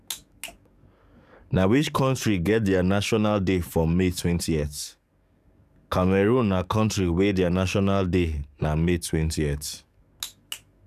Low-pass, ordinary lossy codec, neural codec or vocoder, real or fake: 14.4 kHz; none; codec, 44.1 kHz, 7.8 kbps, DAC; fake